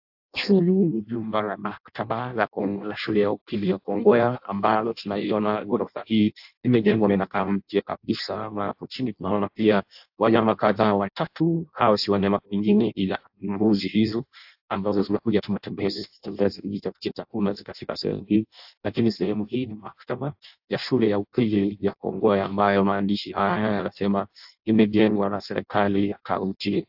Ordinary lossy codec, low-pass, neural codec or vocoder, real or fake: AAC, 48 kbps; 5.4 kHz; codec, 16 kHz in and 24 kHz out, 0.6 kbps, FireRedTTS-2 codec; fake